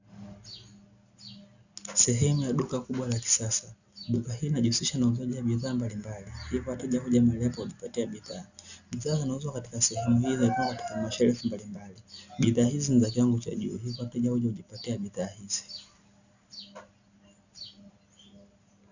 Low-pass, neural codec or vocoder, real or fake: 7.2 kHz; none; real